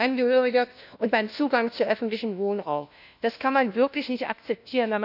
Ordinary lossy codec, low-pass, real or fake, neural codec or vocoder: none; 5.4 kHz; fake; codec, 16 kHz, 1 kbps, FunCodec, trained on LibriTTS, 50 frames a second